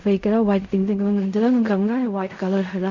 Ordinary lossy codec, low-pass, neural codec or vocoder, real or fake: none; 7.2 kHz; codec, 16 kHz in and 24 kHz out, 0.4 kbps, LongCat-Audio-Codec, fine tuned four codebook decoder; fake